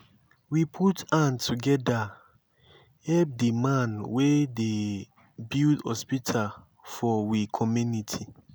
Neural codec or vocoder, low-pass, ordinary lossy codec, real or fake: none; none; none; real